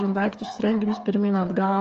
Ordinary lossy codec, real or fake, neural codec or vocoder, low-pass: Opus, 24 kbps; fake; codec, 16 kHz, 8 kbps, FreqCodec, smaller model; 7.2 kHz